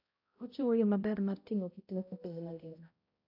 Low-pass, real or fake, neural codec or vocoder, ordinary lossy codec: 5.4 kHz; fake; codec, 16 kHz, 0.5 kbps, X-Codec, HuBERT features, trained on balanced general audio; AAC, 48 kbps